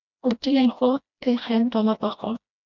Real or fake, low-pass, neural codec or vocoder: fake; 7.2 kHz; codec, 16 kHz, 1 kbps, FreqCodec, smaller model